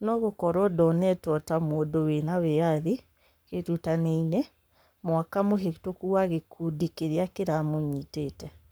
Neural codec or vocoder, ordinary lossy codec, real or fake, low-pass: codec, 44.1 kHz, 7.8 kbps, Pupu-Codec; none; fake; none